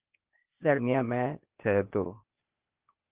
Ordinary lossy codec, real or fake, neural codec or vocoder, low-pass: Opus, 32 kbps; fake; codec, 16 kHz, 0.8 kbps, ZipCodec; 3.6 kHz